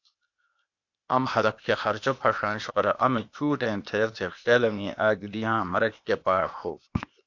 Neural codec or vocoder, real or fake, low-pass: codec, 16 kHz, 0.8 kbps, ZipCodec; fake; 7.2 kHz